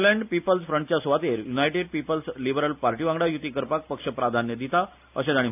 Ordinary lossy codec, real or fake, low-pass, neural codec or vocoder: AAC, 32 kbps; real; 3.6 kHz; none